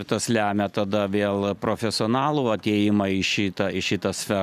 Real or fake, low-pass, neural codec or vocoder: real; 14.4 kHz; none